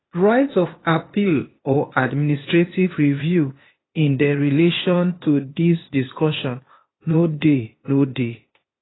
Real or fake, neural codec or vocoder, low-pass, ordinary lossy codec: fake; codec, 16 kHz, 0.8 kbps, ZipCodec; 7.2 kHz; AAC, 16 kbps